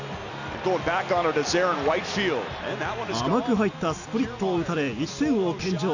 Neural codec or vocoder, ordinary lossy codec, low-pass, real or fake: none; none; 7.2 kHz; real